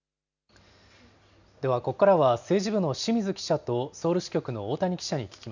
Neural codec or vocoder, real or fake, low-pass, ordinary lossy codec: none; real; 7.2 kHz; none